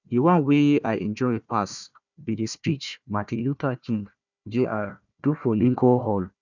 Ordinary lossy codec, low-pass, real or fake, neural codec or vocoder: none; 7.2 kHz; fake; codec, 16 kHz, 1 kbps, FunCodec, trained on Chinese and English, 50 frames a second